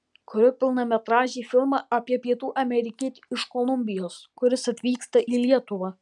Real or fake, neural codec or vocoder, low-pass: real; none; 10.8 kHz